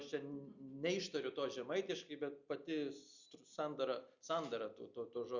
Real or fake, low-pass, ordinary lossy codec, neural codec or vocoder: real; 7.2 kHz; Opus, 64 kbps; none